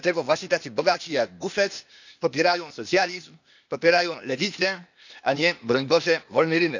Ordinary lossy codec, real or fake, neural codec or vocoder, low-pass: none; fake; codec, 16 kHz, 0.8 kbps, ZipCodec; 7.2 kHz